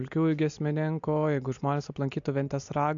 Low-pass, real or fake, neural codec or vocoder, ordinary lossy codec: 7.2 kHz; real; none; MP3, 64 kbps